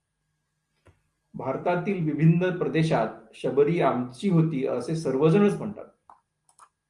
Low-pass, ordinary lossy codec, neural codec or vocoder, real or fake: 10.8 kHz; Opus, 32 kbps; none; real